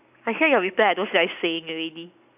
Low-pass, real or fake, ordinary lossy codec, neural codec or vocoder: 3.6 kHz; real; none; none